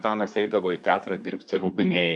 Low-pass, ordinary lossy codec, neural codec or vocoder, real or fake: 10.8 kHz; MP3, 96 kbps; codec, 24 kHz, 1 kbps, SNAC; fake